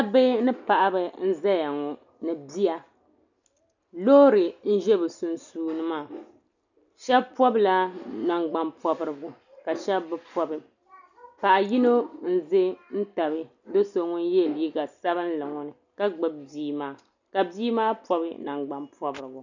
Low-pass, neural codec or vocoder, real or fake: 7.2 kHz; none; real